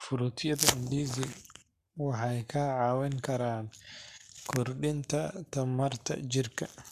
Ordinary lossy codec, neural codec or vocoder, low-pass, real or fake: none; codec, 44.1 kHz, 7.8 kbps, Pupu-Codec; 14.4 kHz; fake